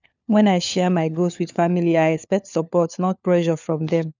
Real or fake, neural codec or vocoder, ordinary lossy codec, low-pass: fake; codec, 16 kHz, 4 kbps, FunCodec, trained on LibriTTS, 50 frames a second; none; 7.2 kHz